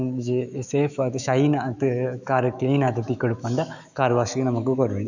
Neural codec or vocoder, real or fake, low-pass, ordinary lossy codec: autoencoder, 48 kHz, 128 numbers a frame, DAC-VAE, trained on Japanese speech; fake; 7.2 kHz; none